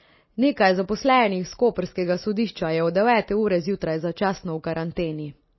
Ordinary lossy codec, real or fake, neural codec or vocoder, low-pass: MP3, 24 kbps; real; none; 7.2 kHz